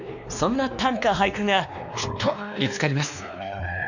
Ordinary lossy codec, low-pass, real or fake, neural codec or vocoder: none; 7.2 kHz; fake; codec, 16 kHz, 2 kbps, X-Codec, WavLM features, trained on Multilingual LibriSpeech